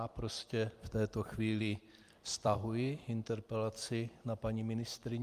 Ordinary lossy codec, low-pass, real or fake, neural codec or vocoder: Opus, 32 kbps; 14.4 kHz; fake; vocoder, 44.1 kHz, 128 mel bands every 256 samples, BigVGAN v2